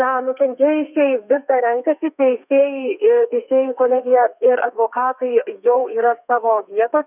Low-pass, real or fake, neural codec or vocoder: 3.6 kHz; fake; codec, 32 kHz, 1.9 kbps, SNAC